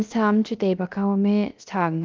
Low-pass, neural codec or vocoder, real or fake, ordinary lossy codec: 7.2 kHz; codec, 16 kHz, 0.3 kbps, FocalCodec; fake; Opus, 32 kbps